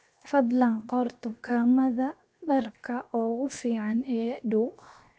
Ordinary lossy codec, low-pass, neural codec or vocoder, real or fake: none; none; codec, 16 kHz, 0.7 kbps, FocalCodec; fake